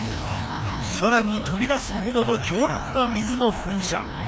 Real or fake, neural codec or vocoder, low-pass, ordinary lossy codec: fake; codec, 16 kHz, 1 kbps, FreqCodec, larger model; none; none